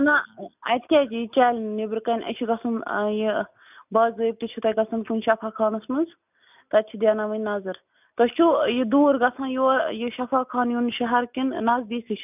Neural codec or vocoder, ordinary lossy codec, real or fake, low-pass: none; none; real; 3.6 kHz